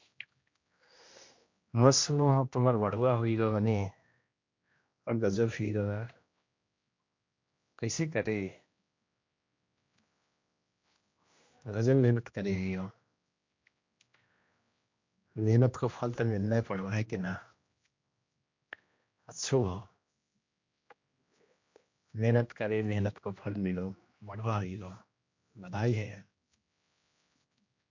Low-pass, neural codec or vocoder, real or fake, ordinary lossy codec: 7.2 kHz; codec, 16 kHz, 1 kbps, X-Codec, HuBERT features, trained on general audio; fake; MP3, 48 kbps